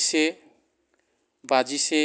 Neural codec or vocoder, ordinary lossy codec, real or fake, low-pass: none; none; real; none